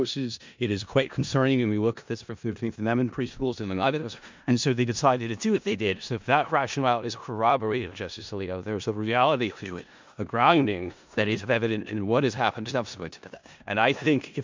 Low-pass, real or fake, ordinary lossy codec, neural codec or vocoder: 7.2 kHz; fake; MP3, 64 kbps; codec, 16 kHz in and 24 kHz out, 0.4 kbps, LongCat-Audio-Codec, four codebook decoder